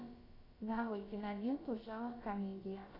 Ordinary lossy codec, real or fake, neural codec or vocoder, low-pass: AAC, 24 kbps; fake; codec, 16 kHz, about 1 kbps, DyCAST, with the encoder's durations; 5.4 kHz